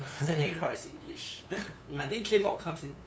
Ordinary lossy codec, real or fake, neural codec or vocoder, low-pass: none; fake; codec, 16 kHz, 2 kbps, FunCodec, trained on LibriTTS, 25 frames a second; none